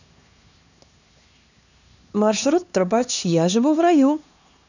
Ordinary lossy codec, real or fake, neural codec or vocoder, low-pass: none; fake; codec, 16 kHz, 2 kbps, X-Codec, HuBERT features, trained on LibriSpeech; 7.2 kHz